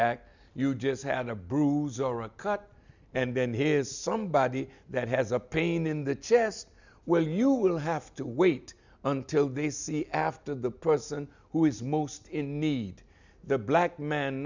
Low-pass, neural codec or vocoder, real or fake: 7.2 kHz; none; real